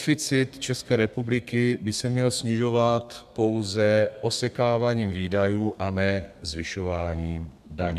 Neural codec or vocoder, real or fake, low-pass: codec, 32 kHz, 1.9 kbps, SNAC; fake; 14.4 kHz